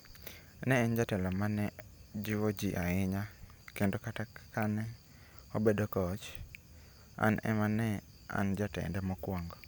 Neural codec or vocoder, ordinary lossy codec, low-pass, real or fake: none; none; none; real